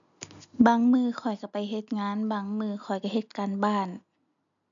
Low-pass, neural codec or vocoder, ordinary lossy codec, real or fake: 7.2 kHz; none; none; real